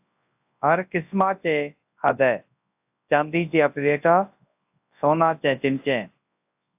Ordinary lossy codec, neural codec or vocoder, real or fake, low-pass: AAC, 32 kbps; codec, 24 kHz, 0.9 kbps, WavTokenizer, large speech release; fake; 3.6 kHz